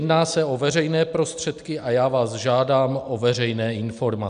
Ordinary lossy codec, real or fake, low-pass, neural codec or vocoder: MP3, 96 kbps; real; 9.9 kHz; none